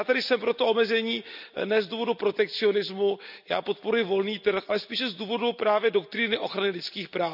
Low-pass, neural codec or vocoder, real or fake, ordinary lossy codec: 5.4 kHz; none; real; none